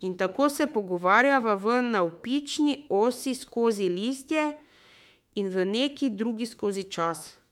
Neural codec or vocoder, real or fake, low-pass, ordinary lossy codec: autoencoder, 48 kHz, 32 numbers a frame, DAC-VAE, trained on Japanese speech; fake; 19.8 kHz; MP3, 96 kbps